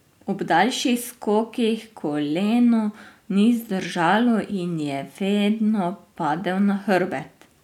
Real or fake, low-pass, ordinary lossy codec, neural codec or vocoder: real; 19.8 kHz; none; none